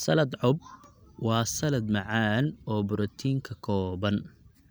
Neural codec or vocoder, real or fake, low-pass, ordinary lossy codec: none; real; none; none